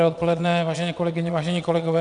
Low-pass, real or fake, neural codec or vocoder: 9.9 kHz; fake; vocoder, 22.05 kHz, 80 mel bands, WaveNeXt